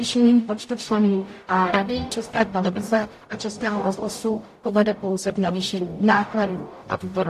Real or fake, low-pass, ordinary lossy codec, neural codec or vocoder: fake; 14.4 kHz; AAC, 64 kbps; codec, 44.1 kHz, 0.9 kbps, DAC